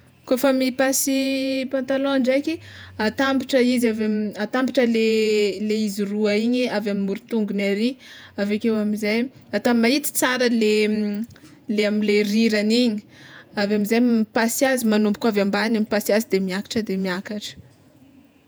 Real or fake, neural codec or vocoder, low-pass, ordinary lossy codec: fake; vocoder, 48 kHz, 128 mel bands, Vocos; none; none